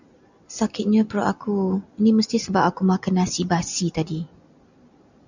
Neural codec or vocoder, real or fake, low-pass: none; real; 7.2 kHz